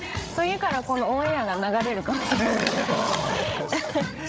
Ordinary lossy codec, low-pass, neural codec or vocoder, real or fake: none; none; codec, 16 kHz, 16 kbps, FreqCodec, larger model; fake